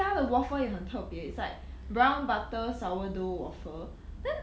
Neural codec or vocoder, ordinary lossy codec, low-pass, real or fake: none; none; none; real